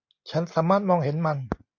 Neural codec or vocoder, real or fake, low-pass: none; real; 7.2 kHz